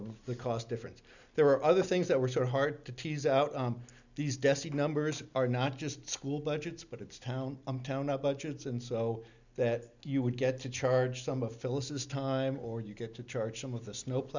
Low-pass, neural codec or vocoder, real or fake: 7.2 kHz; none; real